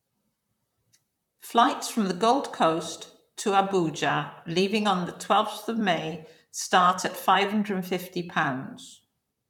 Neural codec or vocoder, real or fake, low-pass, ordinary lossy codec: vocoder, 44.1 kHz, 128 mel bands, Pupu-Vocoder; fake; 19.8 kHz; none